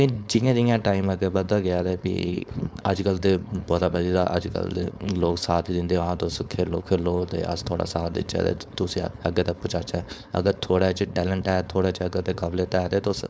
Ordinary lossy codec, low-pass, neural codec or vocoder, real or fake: none; none; codec, 16 kHz, 4.8 kbps, FACodec; fake